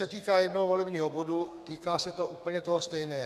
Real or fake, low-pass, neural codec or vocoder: fake; 14.4 kHz; codec, 32 kHz, 1.9 kbps, SNAC